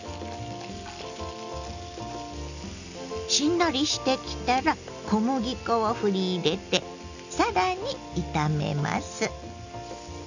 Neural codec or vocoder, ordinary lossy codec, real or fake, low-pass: none; none; real; 7.2 kHz